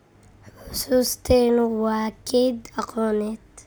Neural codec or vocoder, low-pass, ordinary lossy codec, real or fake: none; none; none; real